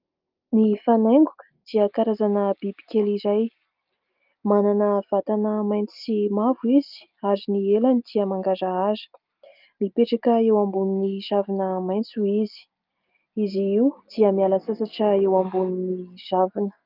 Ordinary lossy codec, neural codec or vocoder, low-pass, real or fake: Opus, 24 kbps; none; 5.4 kHz; real